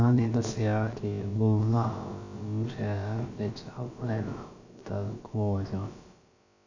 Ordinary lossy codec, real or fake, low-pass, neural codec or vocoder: none; fake; 7.2 kHz; codec, 16 kHz, about 1 kbps, DyCAST, with the encoder's durations